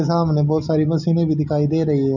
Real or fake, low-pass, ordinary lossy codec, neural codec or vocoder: real; 7.2 kHz; none; none